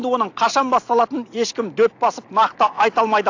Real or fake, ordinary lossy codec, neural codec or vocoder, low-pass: real; AAC, 48 kbps; none; 7.2 kHz